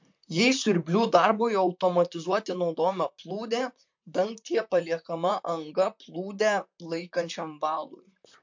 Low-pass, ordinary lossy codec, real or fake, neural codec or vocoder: 7.2 kHz; MP3, 48 kbps; fake; vocoder, 44.1 kHz, 128 mel bands, Pupu-Vocoder